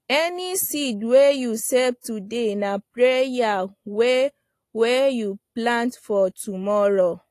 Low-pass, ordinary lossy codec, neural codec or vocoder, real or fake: 14.4 kHz; AAC, 48 kbps; none; real